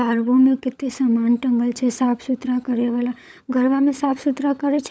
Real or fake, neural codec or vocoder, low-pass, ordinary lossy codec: fake; codec, 16 kHz, 16 kbps, FunCodec, trained on LibriTTS, 50 frames a second; none; none